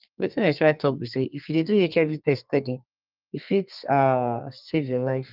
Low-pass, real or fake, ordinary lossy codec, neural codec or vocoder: 5.4 kHz; fake; Opus, 24 kbps; codec, 32 kHz, 1.9 kbps, SNAC